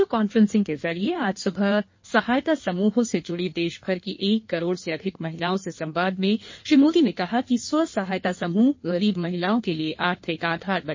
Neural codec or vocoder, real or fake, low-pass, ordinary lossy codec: codec, 16 kHz in and 24 kHz out, 1.1 kbps, FireRedTTS-2 codec; fake; 7.2 kHz; MP3, 32 kbps